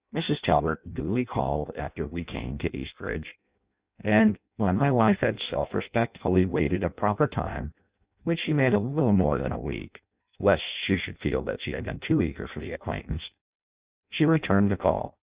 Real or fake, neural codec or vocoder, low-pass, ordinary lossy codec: fake; codec, 16 kHz in and 24 kHz out, 0.6 kbps, FireRedTTS-2 codec; 3.6 kHz; Opus, 64 kbps